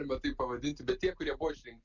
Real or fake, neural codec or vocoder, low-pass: real; none; 7.2 kHz